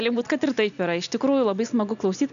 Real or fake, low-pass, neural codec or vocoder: real; 7.2 kHz; none